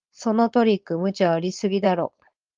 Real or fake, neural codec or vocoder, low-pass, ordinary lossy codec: fake; codec, 16 kHz, 4.8 kbps, FACodec; 7.2 kHz; Opus, 32 kbps